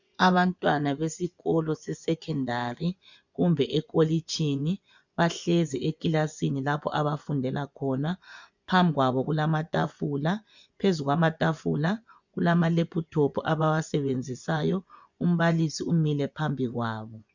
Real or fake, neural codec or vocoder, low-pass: fake; vocoder, 24 kHz, 100 mel bands, Vocos; 7.2 kHz